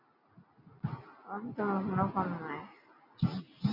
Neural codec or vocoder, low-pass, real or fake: none; 5.4 kHz; real